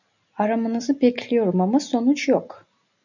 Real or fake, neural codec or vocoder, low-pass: real; none; 7.2 kHz